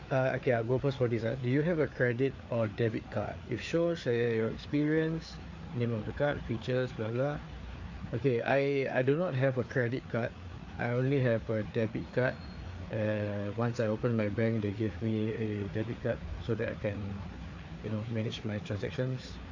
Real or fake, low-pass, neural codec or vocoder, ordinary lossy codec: fake; 7.2 kHz; codec, 16 kHz, 4 kbps, FreqCodec, larger model; AAC, 48 kbps